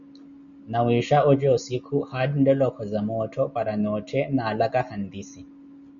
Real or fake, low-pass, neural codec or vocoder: real; 7.2 kHz; none